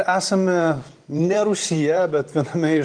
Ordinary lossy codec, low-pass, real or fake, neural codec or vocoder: Opus, 24 kbps; 9.9 kHz; fake; vocoder, 44.1 kHz, 128 mel bands every 512 samples, BigVGAN v2